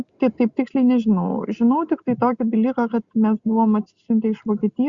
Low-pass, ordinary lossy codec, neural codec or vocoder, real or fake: 7.2 kHz; Opus, 64 kbps; none; real